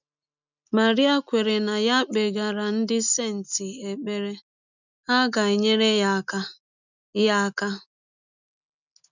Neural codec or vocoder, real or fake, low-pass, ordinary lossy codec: none; real; 7.2 kHz; none